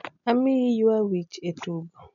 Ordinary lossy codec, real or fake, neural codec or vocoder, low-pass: none; real; none; 7.2 kHz